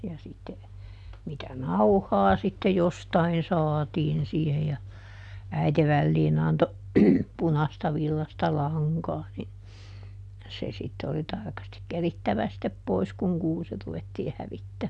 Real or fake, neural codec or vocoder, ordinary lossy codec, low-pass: real; none; none; none